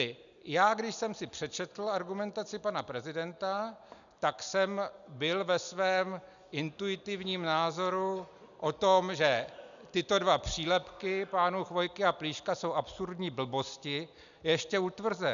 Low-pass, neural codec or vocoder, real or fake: 7.2 kHz; none; real